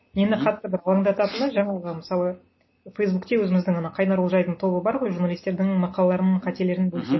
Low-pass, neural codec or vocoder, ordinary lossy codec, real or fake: 7.2 kHz; none; MP3, 24 kbps; real